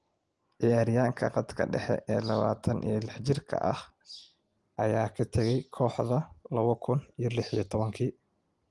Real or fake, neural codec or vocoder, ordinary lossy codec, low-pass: real; none; Opus, 24 kbps; 10.8 kHz